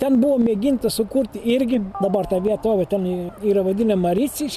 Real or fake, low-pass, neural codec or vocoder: real; 14.4 kHz; none